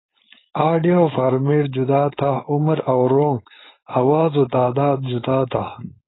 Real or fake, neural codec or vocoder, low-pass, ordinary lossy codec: fake; codec, 16 kHz, 4.8 kbps, FACodec; 7.2 kHz; AAC, 16 kbps